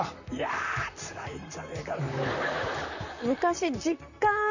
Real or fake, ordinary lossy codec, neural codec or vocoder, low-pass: fake; none; vocoder, 44.1 kHz, 128 mel bands, Pupu-Vocoder; 7.2 kHz